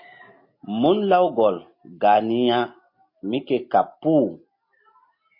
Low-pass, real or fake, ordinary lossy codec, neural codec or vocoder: 5.4 kHz; real; MP3, 32 kbps; none